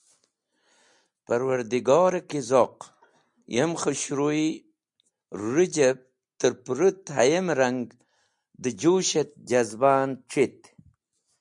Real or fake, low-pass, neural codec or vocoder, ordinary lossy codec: real; 10.8 kHz; none; MP3, 96 kbps